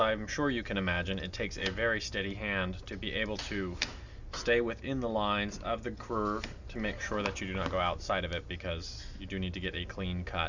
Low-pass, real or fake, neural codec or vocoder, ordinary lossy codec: 7.2 kHz; real; none; Opus, 64 kbps